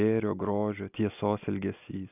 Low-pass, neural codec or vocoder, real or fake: 3.6 kHz; none; real